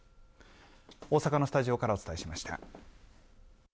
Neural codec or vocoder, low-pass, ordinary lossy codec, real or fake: none; none; none; real